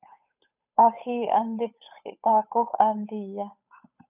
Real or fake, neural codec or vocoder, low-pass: fake; codec, 16 kHz, 8 kbps, FunCodec, trained on Chinese and English, 25 frames a second; 3.6 kHz